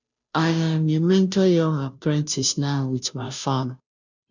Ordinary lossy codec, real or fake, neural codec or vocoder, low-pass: none; fake; codec, 16 kHz, 0.5 kbps, FunCodec, trained on Chinese and English, 25 frames a second; 7.2 kHz